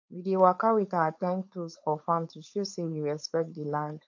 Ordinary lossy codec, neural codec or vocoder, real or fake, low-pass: MP3, 48 kbps; codec, 16 kHz, 4.8 kbps, FACodec; fake; 7.2 kHz